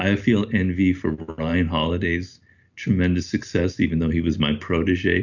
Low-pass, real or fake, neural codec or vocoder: 7.2 kHz; real; none